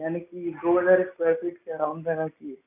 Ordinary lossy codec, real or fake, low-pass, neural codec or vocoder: none; real; 3.6 kHz; none